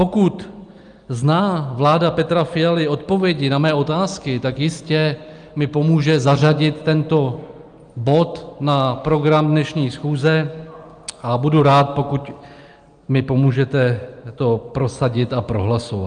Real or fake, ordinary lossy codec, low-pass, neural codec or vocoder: real; Opus, 32 kbps; 9.9 kHz; none